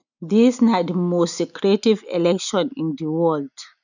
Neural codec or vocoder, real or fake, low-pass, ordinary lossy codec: none; real; 7.2 kHz; none